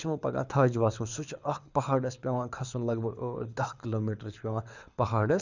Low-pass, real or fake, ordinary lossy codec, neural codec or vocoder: 7.2 kHz; fake; none; codec, 24 kHz, 6 kbps, HILCodec